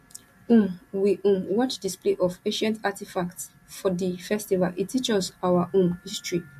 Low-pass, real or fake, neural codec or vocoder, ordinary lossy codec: 14.4 kHz; real; none; MP3, 64 kbps